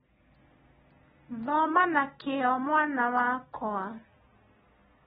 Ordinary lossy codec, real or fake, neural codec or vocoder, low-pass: AAC, 16 kbps; real; none; 19.8 kHz